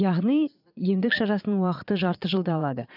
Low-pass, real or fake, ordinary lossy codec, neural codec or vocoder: 5.4 kHz; fake; none; vocoder, 22.05 kHz, 80 mel bands, Vocos